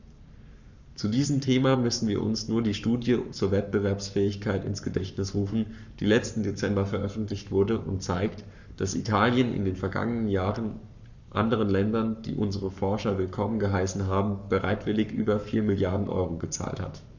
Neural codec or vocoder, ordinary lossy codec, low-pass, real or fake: codec, 44.1 kHz, 7.8 kbps, Pupu-Codec; none; 7.2 kHz; fake